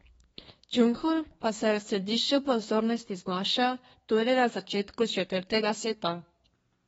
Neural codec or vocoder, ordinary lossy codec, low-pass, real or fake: codec, 32 kHz, 1.9 kbps, SNAC; AAC, 24 kbps; 14.4 kHz; fake